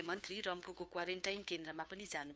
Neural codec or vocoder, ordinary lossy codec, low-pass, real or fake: codec, 16 kHz, 2 kbps, FunCodec, trained on Chinese and English, 25 frames a second; none; none; fake